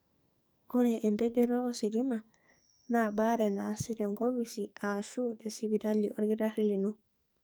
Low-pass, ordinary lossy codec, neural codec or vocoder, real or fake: none; none; codec, 44.1 kHz, 2.6 kbps, SNAC; fake